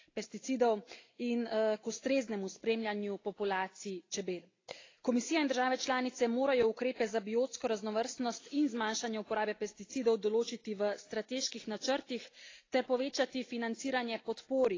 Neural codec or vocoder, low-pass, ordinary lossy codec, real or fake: none; 7.2 kHz; AAC, 32 kbps; real